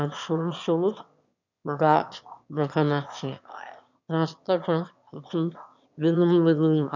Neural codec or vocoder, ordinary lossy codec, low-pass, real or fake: autoencoder, 22.05 kHz, a latent of 192 numbers a frame, VITS, trained on one speaker; none; 7.2 kHz; fake